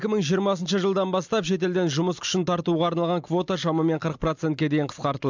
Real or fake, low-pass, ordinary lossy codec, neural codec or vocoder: real; 7.2 kHz; MP3, 64 kbps; none